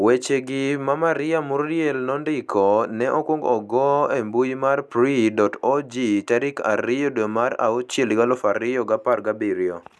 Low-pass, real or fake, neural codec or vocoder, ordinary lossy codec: none; real; none; none